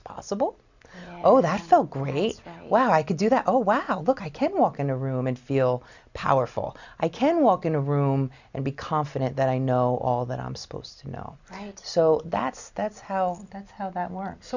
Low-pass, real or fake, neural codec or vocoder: 7.2 kHz; real; none